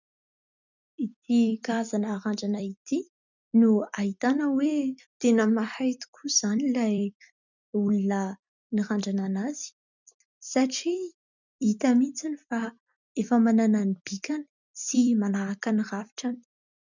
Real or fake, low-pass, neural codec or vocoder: fake; 7.2 kHz; vocoder, 44.1 kHz, 128 mel bands every 256 samples, BigVGAN v2